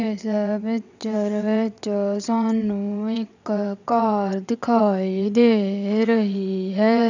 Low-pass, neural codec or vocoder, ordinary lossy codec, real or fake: 7.2 kHz; vocoder, 22.05 kHz, 80 mel bands, WaveNeXt; none; fake